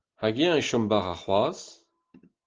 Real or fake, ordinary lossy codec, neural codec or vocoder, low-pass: real; Opus, 16 kbps; none; 7.2 kHz